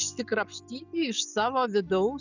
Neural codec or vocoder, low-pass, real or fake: none; 7.2 kHz; real